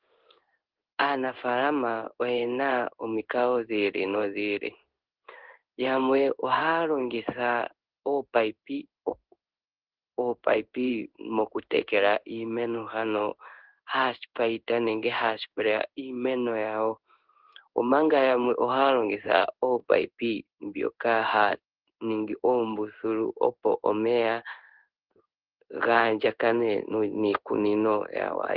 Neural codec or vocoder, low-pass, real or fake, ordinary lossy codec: codec, 16 kHz in and 24 kHz out, 1 kbps, XY-Tokenizer; 5.4 kHz; fake; Opus, 16 kbps